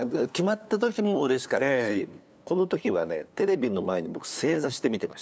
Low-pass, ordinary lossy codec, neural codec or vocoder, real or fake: none; none; codec, 16 kHz, 2 kbps, FunCodec, trained on LibriTTS, 25 frames a second; fake